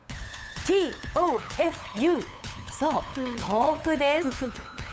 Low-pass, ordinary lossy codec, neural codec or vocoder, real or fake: none; none; codec, 16 kHz, 8 kbps, FunCodec, trained on LibriTTS, 25 frames a second; fake